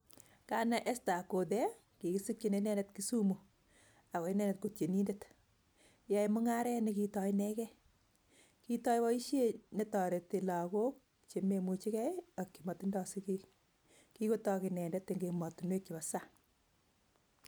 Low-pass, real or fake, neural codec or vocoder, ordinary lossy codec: none; real; none; none